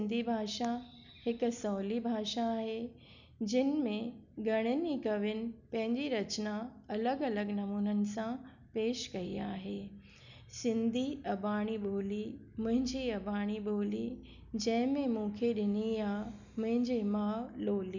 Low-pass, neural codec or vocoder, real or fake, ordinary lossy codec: 7.2 kHz; none; real; none